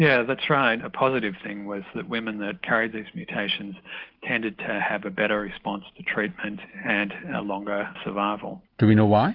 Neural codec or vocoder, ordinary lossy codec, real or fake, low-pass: none; Opus, 32 kbps; real; 5.4 kHz